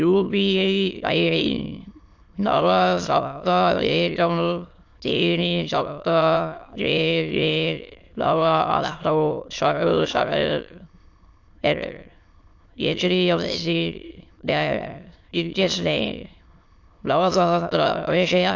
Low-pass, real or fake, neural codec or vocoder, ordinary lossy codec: 7.2 kHz; fake; autoencoder, 22.05 kHz, a latent of 192 numbers a frame, VITS, trained on many speakers; AAC, 48 kbps